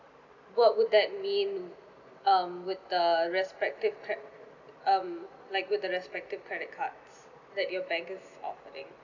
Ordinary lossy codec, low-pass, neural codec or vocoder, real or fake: none; 7.2 kHz; none; real